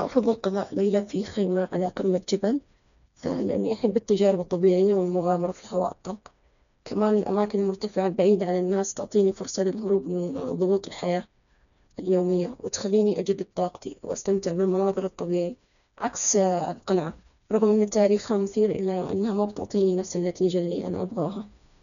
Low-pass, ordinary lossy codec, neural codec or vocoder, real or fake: 7.2 kHz; none; codec, 16 kHz, 2 kbps, FreqCodec, smaller model; fake